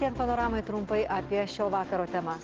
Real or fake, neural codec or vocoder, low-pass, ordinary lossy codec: real; none; 7.2 kHz; Opus, 16 kbps